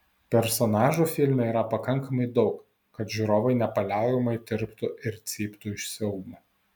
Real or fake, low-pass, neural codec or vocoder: real; 19.8 kHz; none